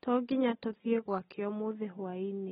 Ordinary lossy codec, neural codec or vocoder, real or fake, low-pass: AAC, 16 kbps; none; real; 7.2 kHz